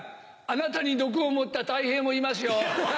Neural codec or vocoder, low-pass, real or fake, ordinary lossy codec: none; none; real; none